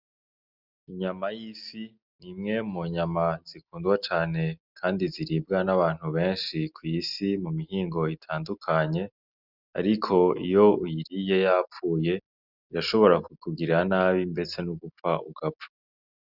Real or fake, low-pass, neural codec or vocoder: real; 5.4 kHz; none